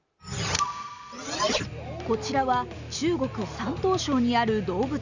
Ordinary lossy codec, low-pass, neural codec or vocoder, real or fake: none; 7.2 kHz; none; real